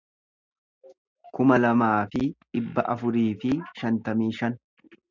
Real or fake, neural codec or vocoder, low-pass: real; none; 7.2 kHz